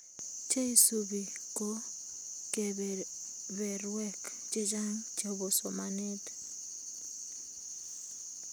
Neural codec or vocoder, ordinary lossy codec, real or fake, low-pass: none; none; real; none